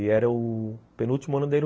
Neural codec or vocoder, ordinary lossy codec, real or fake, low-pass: none; none; real; none